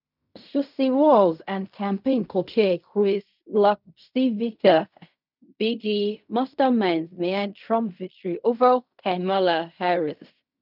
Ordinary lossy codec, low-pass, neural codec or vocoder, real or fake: none; 5.4 kHz; codec, 16 kHz in and 24 kHz out, 0.4 kbps, LongCat-Audio-Codec, fine tuned four codebook decoder; fake